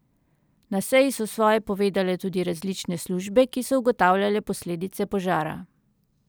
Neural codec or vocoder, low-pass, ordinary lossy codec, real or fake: none; none; none; real